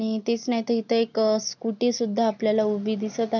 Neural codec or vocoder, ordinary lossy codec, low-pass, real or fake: none; none; 7.2 kHz; real